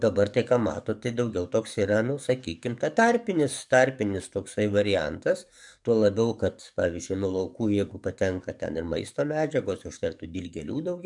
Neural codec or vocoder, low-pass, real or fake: codec, 44.1 kHz, 7.8 kbps, DAC; 10.8 kHz; fake